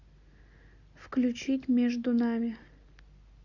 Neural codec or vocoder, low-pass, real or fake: none; 7.2 kHz; real